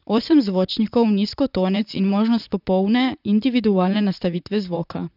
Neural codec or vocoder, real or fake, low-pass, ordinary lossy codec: vocoder, 44.1 kHz, 128 mel bands, Pupu-Vocoder; fake; 5.4 kHz; none